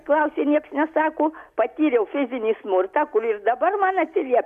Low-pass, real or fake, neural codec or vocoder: 14.4 kHz; real; none